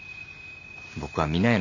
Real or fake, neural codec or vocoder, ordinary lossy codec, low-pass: real; none; none; 7.2 kHz